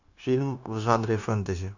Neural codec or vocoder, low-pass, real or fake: codec, 16 kHz, 0.9 kbps, LongCat-Audio-Codec; 7.2 kHz; fake